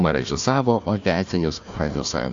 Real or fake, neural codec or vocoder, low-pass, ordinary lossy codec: fake; codec, 16 kHz, 1 kbps, FunCodec, trained on Chinese and English, 50 frames a second; 7.2 kHz; AAC, 64 kbps